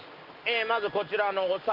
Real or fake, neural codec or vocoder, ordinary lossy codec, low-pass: real; none; Opus, 32 kbps; 5.4 kHz